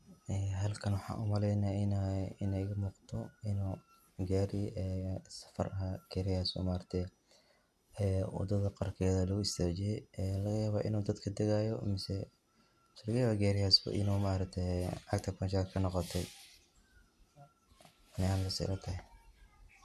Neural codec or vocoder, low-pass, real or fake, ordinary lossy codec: none; 14.4 kHz; real; none